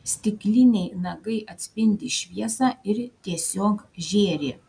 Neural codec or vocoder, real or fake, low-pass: none; real; 9.9 kHz